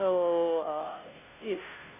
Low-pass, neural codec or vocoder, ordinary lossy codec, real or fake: 3.6 kHz; codec, 16 kHz, 0.5 kbps, FunCodec, trained on Chinese and English, 25 frames a second; none; fake